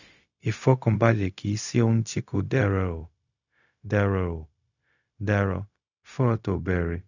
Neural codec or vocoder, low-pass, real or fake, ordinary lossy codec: codec, 16 kHz, 0.4 kbps, LongCat-Audio-Codec; 7.2 kHz; fake; none